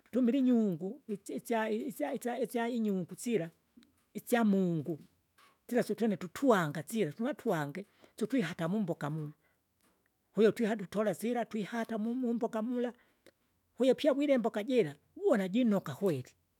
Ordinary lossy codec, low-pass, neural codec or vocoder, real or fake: none; 19.8 kHz; autoencoder, 48 kHz, 128 numbers a frame, DAC-VAE, trained on Japanese speech; fake